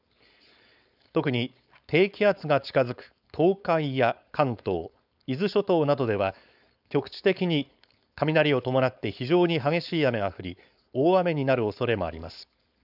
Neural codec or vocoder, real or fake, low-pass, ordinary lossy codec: codec, 16 kHz, 4.8 kbps, FACodec; fake; 5.4 kHz; none